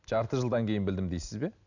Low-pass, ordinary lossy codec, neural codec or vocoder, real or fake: 7.2 kHz; none; none; real